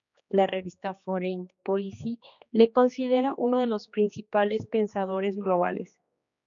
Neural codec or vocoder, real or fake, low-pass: codec, 16 kHz, 2 kbps, X-Codec, HuBERT features, trained on general audio; fake; 7.2 kHz